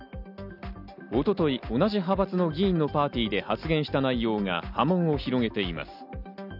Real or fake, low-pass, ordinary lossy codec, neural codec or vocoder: real; 5.4 kHz; none; none